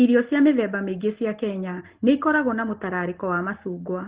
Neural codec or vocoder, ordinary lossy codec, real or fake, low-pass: none; Opus, 16 kbps; real; 3.6 kHz